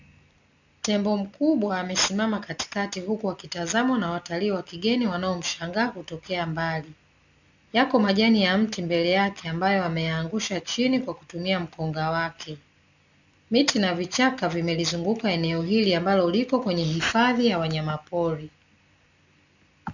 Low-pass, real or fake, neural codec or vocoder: 7.2 kHz; real; none